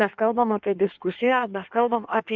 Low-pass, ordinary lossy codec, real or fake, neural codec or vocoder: 7.2 kHz; MP3, 64 kbps; fake; codec, 16 kHz in and 24 kHz out, 1.1 kbps, FireRedTTS-2 codec